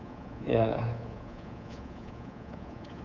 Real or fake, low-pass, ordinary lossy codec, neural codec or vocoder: fake; 7.2 kHz; none; codec, 24 kHz, 3.1 kbps, DualCodec